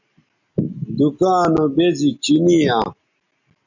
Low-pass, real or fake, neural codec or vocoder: 7.2 kHz; real; none